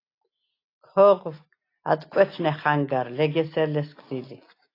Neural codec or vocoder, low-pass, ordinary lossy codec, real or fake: none; 5.4 kHz; AAC, 32 kbps; real